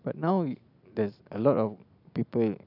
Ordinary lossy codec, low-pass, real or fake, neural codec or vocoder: none; 5.4 kHz; real; none